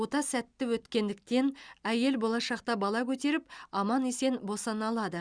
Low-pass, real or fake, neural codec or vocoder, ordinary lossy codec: 9.9 kHz; real; none; Opus, 64 kbps